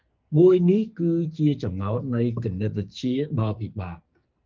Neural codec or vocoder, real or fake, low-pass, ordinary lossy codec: codec, 44.1 kHz, 2.6 kbps, SNAC; fake; 7.2 kHz; Opus, 24 kbps